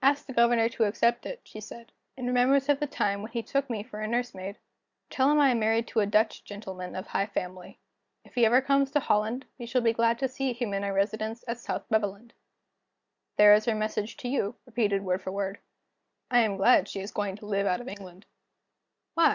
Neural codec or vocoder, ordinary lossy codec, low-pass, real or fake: none; Opus, 64 kbps; 7.2 kHz; real